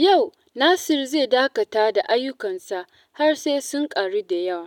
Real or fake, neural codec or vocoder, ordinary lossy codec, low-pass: real; none; none; 19.8 kHz